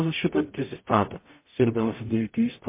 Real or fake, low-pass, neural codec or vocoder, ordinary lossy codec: fake; 3.6 kHz; codec, 44.1 kHz, 0.9 kbps, DAC; MP3, 24 kbps